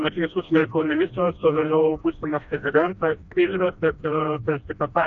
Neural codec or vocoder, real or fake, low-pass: codec, 16 kHz, 1 kbps, FreqCodec, smaller model; fake; 7.2 kHz